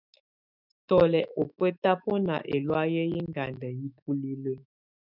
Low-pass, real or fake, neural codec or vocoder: 5.4 kHz; fake; autoencoder, 48 kHz, 128 numbers a frame, DAC-VAE, trained on Japanese speech